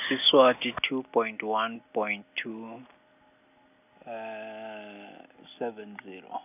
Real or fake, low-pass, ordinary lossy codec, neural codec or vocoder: real; 3.6 kHz; none; none